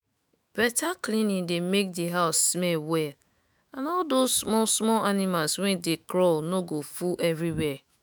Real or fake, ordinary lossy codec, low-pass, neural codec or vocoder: fake; none; none; autoencoder, 48 kHz, 128 numbers a frame, DAC-VAE, trained on Japanese speech